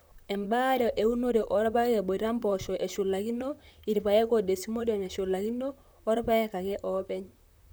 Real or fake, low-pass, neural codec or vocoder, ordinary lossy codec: fake; none; vocoder, 44.1 kHz, 128 mel bands, Pupu-Vocoder; none